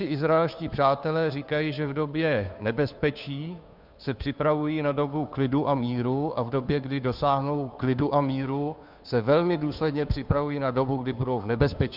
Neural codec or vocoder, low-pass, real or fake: codec, 16 kHz, 2 kbps, FunCodec, trained on Chinese and English, 25 frames a second; 5.4 kHz; fake